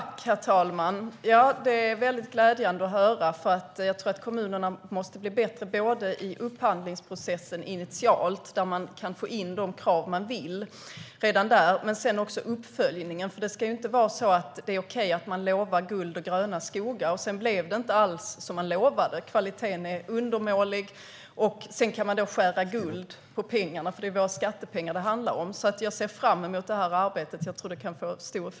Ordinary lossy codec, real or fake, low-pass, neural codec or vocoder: none; real; none; none